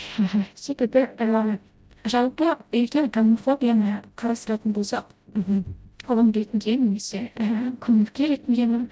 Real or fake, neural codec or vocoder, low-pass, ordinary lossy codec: fake; codec, 16 kHz, 0.5 kbps, FreqCodec, smaller model; none; none